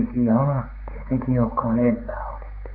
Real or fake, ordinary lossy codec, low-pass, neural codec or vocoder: fake; AAC, 48 kbps; 5.4 kHz; codec, 16 kHz, 4 kbps, X-Codec, HuBERT features, trained on balanced general audio